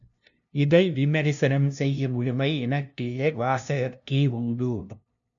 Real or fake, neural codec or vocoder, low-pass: fake; codec, 16 kHz, 0.5 kbps, FunCodec, trained on LibriTTS, 25 frames a second; 7.2 kHz